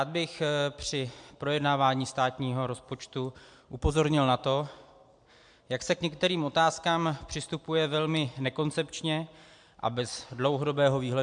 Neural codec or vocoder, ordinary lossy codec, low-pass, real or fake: none; MP3, 64 kbps; 9.9 kHz; real